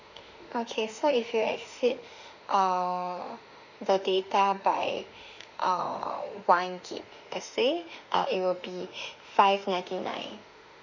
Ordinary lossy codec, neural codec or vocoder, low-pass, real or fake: none; autoencoder, 48 kHz, 32 numbers a frame, DAC-VAE, trained on Japanese speech; 7.2 kHz; fake